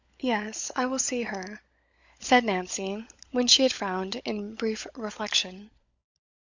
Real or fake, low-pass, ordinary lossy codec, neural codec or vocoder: fake; 7.2 kHz; Opus, 64 kbps; codec, 16 kHz, 16 kbps, FunCodec, trained on LibriTTS, 50 frames a second